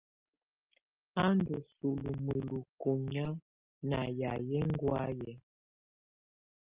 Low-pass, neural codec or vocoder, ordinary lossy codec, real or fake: 3.6 kHz; none; Opus, 24 kbps; real